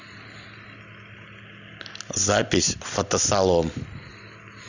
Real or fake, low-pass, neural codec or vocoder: real; 7.2 kHz; none